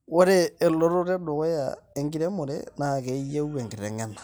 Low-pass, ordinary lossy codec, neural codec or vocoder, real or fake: none; none; none; real